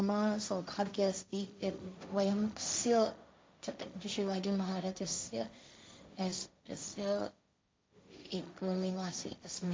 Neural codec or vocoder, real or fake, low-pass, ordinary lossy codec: codec, 16 kHz, 1.1 kbps, Voila-Tokenizer; fake; none; none